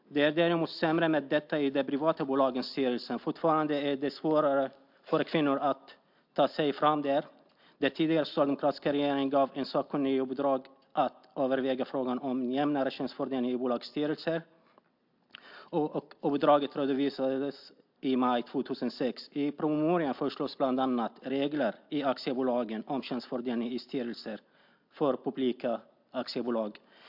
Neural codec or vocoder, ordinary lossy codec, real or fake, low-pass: none; MP3, 48 kbps; real; 5.4 kHz